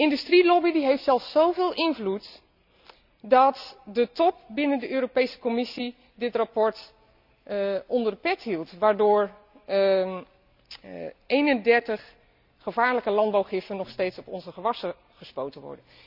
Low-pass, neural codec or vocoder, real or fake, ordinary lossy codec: 5.4 kHz; none; real; none